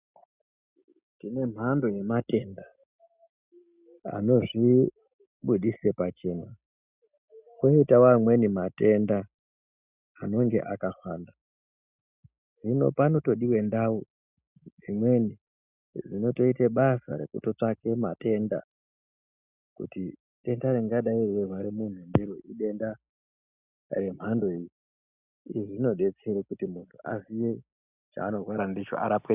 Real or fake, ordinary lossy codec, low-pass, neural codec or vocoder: real; Opus, 64 kbps; 3.6 kHz; none